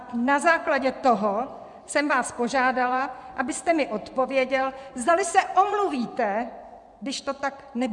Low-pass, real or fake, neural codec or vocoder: 10.8 kHz; fake; vocoder, 24 kHz, 100 mel bands, Vocos